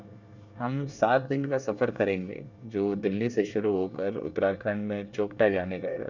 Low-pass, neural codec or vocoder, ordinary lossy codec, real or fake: 7.2 kHz; codec, 24 kHz, 1 kbps, SNAC; none; fake